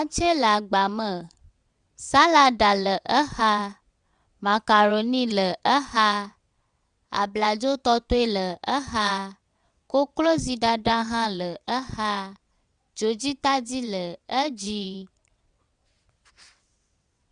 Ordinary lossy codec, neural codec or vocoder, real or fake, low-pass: Opus, 64 kbps; vocoder, 22.05 kHz, 80 mel bands, WaveNeXt; fake; 9.9 kHz